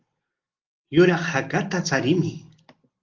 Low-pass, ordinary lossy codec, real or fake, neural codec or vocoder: 7.2 kHz; Opus, 32 kbps; real; none